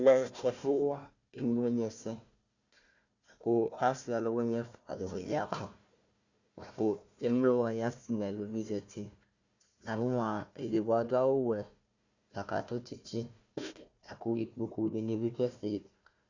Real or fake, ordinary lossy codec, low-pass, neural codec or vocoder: fake; Opus, 64 kbps; 7.2 kHz; codec, 16 kHz, 1 kbps, FunCodec, trained on Chinese and English, 50 frames a second